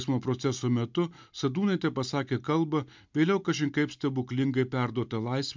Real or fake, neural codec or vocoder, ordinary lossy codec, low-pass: real; none; MP3, 64 kbps; 7.2 kHz